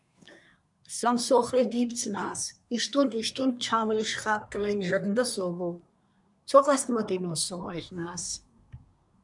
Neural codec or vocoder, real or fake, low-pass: codec, 24 kHz, 1 kbps, SNAC; fake; 10.8 kHz